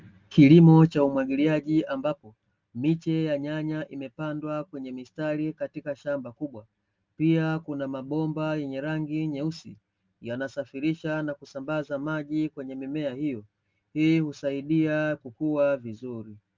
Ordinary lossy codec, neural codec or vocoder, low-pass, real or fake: Opus, 24 kbps; none; 7.2 kHz; real